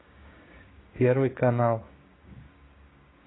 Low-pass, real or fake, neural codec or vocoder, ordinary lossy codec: 7.2 kHz; fake; codec, 16 kHz, 6 kbps, DAC; AAC, 16 kbps